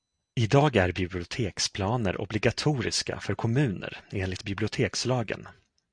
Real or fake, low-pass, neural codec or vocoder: real; 9.9 kHz; none